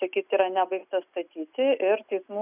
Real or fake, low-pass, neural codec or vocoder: real; 3.6 kHz; none